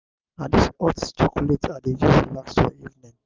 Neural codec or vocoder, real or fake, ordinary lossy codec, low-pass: none; real; Opus, 32 kbps; 7.2 kHz